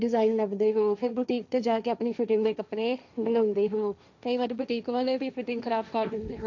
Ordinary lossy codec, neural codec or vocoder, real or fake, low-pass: none; codec, 16 kHz, 1.1 kbps, Voila-Tokenizer; fake; 7.2 kHz